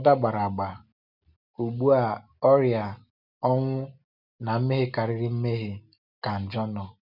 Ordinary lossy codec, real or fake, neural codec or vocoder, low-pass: none; real; none; 5.4 kHz